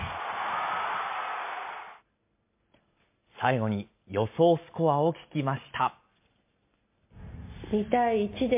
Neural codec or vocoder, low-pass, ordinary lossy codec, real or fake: none; 3.6 kHz; MP3, 24 kbps; real